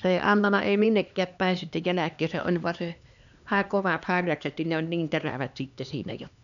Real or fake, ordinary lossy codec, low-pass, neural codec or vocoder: fake; none; 7.2 kHz; codec, 16 kHz, 2 kbps, X-Codec, HuBERT features, trained on LibriSpeech